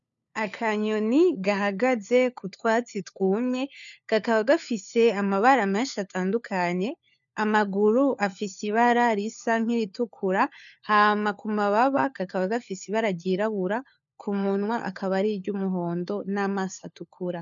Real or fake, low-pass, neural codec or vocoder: fake; 7.2 kHz; codec, 16 kHz, 4 kbps, FunCodec, trained on LibriTTS, 50 frames a second